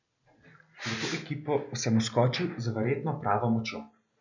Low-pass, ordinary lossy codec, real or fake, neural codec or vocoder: 7.2 kHz; none; real; none